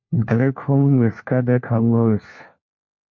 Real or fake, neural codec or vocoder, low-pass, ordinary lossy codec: fake; codec, 16 kHz, 1 kbps, FunCodec, trained on LibriTTS, 50 frames a second; 7.2 kHz; MP3, 48 kbps